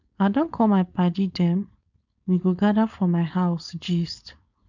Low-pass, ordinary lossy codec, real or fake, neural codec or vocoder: 7.2 kHz; none; fake; codec, 16 kHz, 4.8 kbps, FACodec